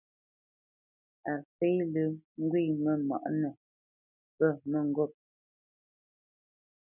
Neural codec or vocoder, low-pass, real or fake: none; 3.6 kHz; real